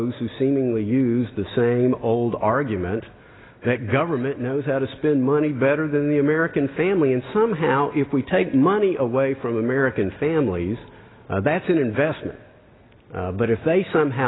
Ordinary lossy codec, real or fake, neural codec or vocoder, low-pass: AAC, 16 kbps; real; none; 7.2 kHz